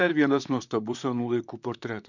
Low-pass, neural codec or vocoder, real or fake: 7.2 kHz; vocoder, 44.1 kHz, 128 mel bands, Pupu-Vocoder; fake